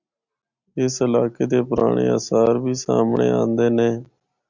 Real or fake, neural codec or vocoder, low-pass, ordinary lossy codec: real; none; 7.2 kHz; Opus, 64 kbps